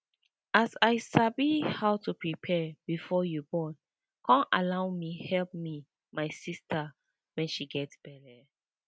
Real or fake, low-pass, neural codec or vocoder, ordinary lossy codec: real; none; none; none